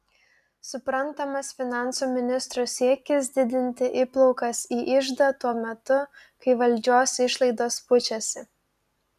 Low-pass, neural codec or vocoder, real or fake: 14.4 kHz; none; real